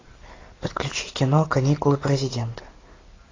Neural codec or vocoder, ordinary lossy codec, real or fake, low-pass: none; AAC, 32 kbps; real; 7.2 kHz